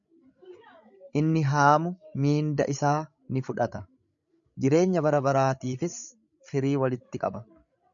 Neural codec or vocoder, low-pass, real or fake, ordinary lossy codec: codec, 16 kHz, 16 kbps, FreqCodec, larger model; 7.2 kHz; fake; MP3, 96 kbps